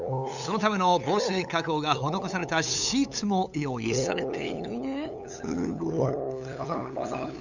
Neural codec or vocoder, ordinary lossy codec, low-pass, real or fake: codec, 16 kHz, 8 kbps, FunCodec, trained on LibriTTS, 25 frames a second; none; 7.2 kHz; fake